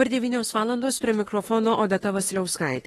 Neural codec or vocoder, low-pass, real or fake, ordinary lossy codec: autoencoder, 48 kHz, 32 numbers a frame, DAC-VAE, trained on Japanese speech; 19.8 kHz; fake; AAC, 32 kbps